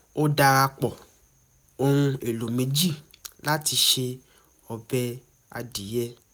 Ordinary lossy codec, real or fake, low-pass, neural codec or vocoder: none; real; none; none